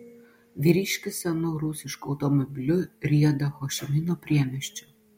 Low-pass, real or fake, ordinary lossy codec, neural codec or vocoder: 19.8 kHz; real; MP3, 64 kbps; none